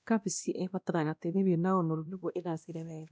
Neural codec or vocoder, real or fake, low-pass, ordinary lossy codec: codec, 16 kHz, 1 kbps, X-Codec, WavLM features, trained on Multilingual LibriSpeech; fake; none; none